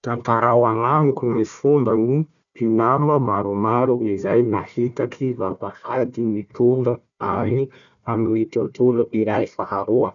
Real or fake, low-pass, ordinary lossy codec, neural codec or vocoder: fake; 7.2 kHz; none; codec, 16 kHz, 1 kbps, FunCodec, trained on Chinese and English, 50 frames a second